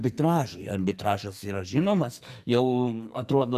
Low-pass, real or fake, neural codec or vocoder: 14.4 kHz; fake; codec, 44.1 kHz, 2.6 kbps, SNAC